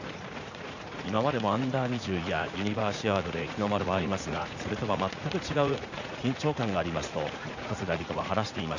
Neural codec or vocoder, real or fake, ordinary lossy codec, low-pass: vocoder, 44.1 kHz, 80 mel bands, Vocos; fake; none; 7.2 kHz